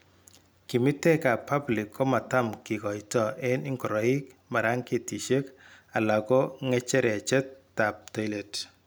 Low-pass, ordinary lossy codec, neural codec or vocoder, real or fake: none; none; none; real